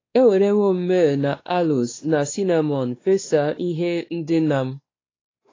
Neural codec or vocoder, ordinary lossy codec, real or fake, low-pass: codec, 16 kHz, 2 kbps, X-Codec, WavLM features, trained on Multilingual LibriSpeech; AAC, 32 kbps; fake; 7.2 kHz